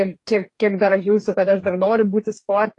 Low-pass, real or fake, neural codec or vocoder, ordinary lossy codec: 10.8 kHz; fake; codec, 44.1 kHz, 2.6 kbps, DAC; AAC, 48 kbps